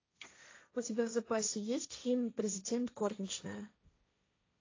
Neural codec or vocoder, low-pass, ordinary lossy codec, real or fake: codec, 16 kHz, 1.1 kbps, Voila-Tokenizer; 7.2 kHz; AAC, 32 kbps; fake